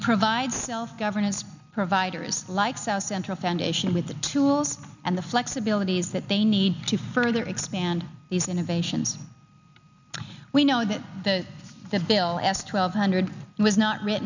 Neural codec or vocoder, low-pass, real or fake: none; 7.2 kHz; real